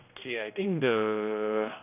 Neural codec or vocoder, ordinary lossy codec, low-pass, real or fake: codec, 16 kHz, 1 kbps, X-Codec, HuBERT features, trained on general audio; none; 3.6 kHz; fake